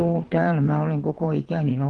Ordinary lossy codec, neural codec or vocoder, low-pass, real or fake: Opus, 16 kbps; vocoder, 22.05 kHz, 80 mel bands, WaveNeXt; 9.9 kHz; fake